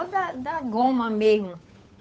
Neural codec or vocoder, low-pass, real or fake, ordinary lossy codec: codec, 16 kHz, 8 kbps, FunCodec, trained on Chinese and English, 25 frames a second; none; fake; none